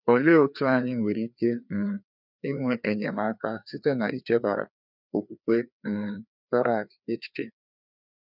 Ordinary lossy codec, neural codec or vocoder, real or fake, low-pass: none; codec, 16 kHz, 2 kbps, FreqCodec, larger model; fake; 5.4 kHz